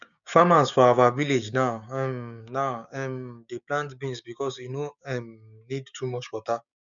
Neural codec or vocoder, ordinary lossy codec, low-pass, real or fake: codec, 16 kHz, 6 kbps, DAC; none; 7.2 kHz; fake